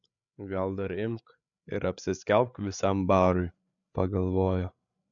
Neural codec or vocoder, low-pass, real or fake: codec, 16 kHz, 8 kbps, FreqCodec, larger model; 7.2 kHz; fake